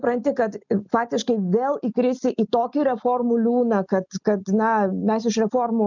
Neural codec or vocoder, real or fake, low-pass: none; real; 7.2 kHz